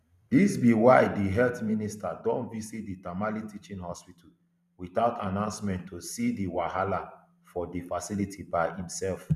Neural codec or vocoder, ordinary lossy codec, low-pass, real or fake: vocoder, 44.1 kHz, 128 mel bands every 512 samples, BigVGAN v2; none; 14.4 kHz; fake